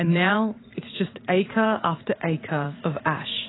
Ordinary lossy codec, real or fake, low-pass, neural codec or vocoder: AAC, 16 kbps; fake; 7.2 kHz; vocoder, 44.1 kHz, 80 mel bands, Vocos